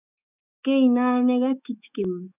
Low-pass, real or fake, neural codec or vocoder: 3.6 kHz; fake; autoencoder, 48 kHz, 128 numbers a frame, DAC-VAE, trained on Japanese speech